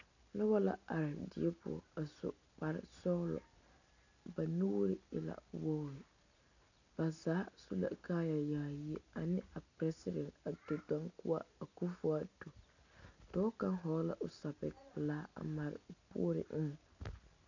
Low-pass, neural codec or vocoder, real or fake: 7.2 kHz; none; real